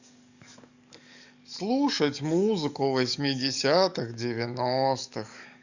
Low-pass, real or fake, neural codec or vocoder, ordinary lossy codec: 7.2 kHz; fake; codec, 44.1 kHz, 7.8 kbps, DAC; none